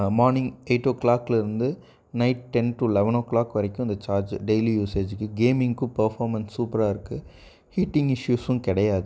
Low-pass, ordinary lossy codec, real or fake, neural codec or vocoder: none; none; real; none